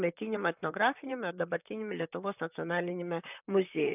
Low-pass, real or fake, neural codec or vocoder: 3.6 kHz; fake; vocoder, 44.1 kHz, 128 mel bands, Pupu-Vocoder